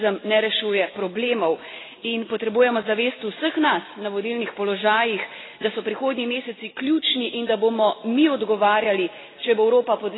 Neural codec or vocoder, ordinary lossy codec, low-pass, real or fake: none; AAC, 16 kbps; 7.2 kHz; real